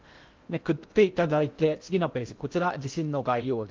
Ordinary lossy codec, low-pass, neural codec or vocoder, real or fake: Opus, 32 kbps; 7.2 kHz; codec, 16 kHz in and 24 kHz out, 0.6 kbps, FocalCodec, streaming, 2048 codes; fake